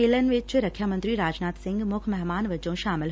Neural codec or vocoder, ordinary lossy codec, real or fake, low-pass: none; none; real; none